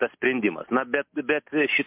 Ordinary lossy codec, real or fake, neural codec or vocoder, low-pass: MP3, 32 kbps; real; none; 3.6 kHz